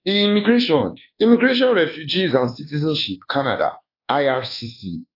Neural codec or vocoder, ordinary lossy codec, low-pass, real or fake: codec, 24 kHz, 1.2 kbps, DualCodec; AAC, 32 kbps; 5.4 kHz; fake